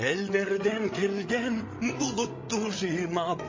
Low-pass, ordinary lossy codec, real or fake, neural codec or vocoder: 7.2 kHz; MP3, 32 kbps; fake; codec, 16 kHz, 16 kbps, FreqCodec, larger model